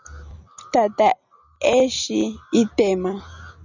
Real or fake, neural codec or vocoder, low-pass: real; none; 7.2 kHz